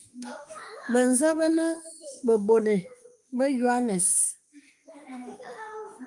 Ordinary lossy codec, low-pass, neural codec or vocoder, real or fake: Opus, 32 kbps; 10.8 kHz; autoencoder, 48 kHz, 32 numbers a frame, DAC-VAE, trained on Japanese speech; fake